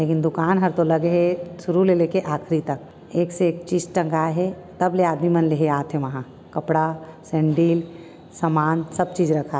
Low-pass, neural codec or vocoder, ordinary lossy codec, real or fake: none; none; none; real